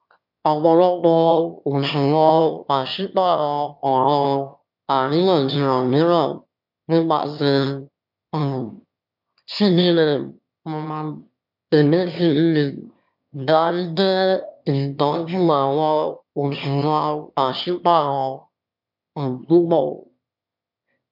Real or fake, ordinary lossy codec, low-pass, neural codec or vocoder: fake; MP3, 48 kbps; 5.4 kHz; autoencoder, 22.05 kHz, a latent of 192 numbers a frame, VITS, trained on one speaker